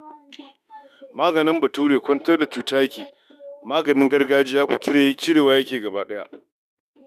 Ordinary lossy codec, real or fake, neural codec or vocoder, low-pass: none; fake; autoencoder, 48 kHz, 32 numbers a frame, DAC-VAE, trained on Japanese speech; 14.4 kHz